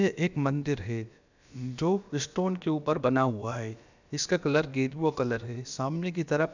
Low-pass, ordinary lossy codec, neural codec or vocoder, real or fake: 7.2 kHz; none; codec, 16 kHz, about 1 kbps, DyCAST, with the encoder's durations; fake